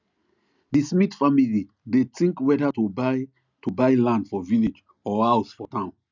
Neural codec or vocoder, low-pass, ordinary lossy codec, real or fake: none; 7.2 kHz; none; real